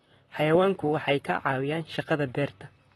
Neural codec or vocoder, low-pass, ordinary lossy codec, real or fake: vocoder, 44.1 kHz, 128 mel bands, Pupu-Vocoder; 19.8 kHz; AAC, 32 kbps; fake